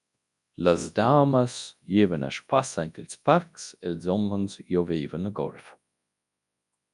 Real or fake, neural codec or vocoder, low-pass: fake; codec, 24 kHz, 0.9 kbps, WavTokenizer, large speech release; 10.8 kHz